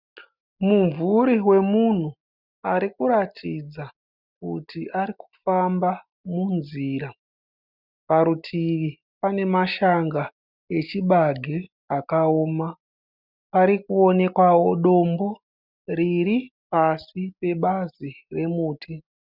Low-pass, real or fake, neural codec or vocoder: 5.4 kHz; real; none